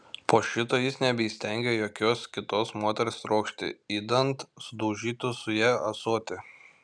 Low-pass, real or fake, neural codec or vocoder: 9.9 kHz; real; none